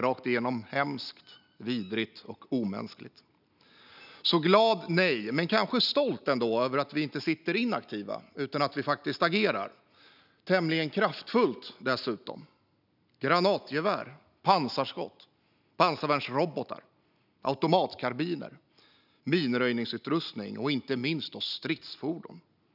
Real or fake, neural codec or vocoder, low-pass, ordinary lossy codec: real; none; 5.4 kHz; none